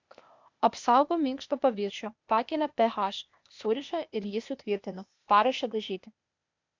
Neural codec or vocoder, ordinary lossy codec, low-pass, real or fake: codec, 16 kHz, 0.8 kbps, ZipCodec; MP3, 64 kbps; 7.2 kHz; fake